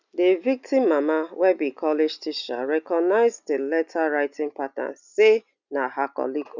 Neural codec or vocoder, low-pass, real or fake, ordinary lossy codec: none; 7.2 kHz; real; none